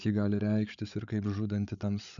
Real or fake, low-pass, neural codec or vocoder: fake; 7.2 kHz; codec, 16 kHz, 8 kbps, FreqCodec, larger model